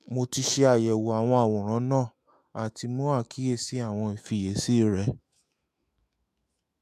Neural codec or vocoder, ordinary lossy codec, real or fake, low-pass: autoencoder, 48 kHz, 128 numbers a frame, DAC-VAE, trained on Japanese speech; AAC, 96 kbps; fake; 14.4 kHz